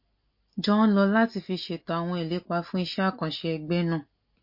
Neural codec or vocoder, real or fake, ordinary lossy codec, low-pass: none; real; MP3, 32 kbps; 5.4 kHz